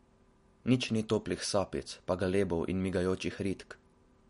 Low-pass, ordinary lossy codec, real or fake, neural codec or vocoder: 10.8 kHz; MP3, 48 kbps; real; none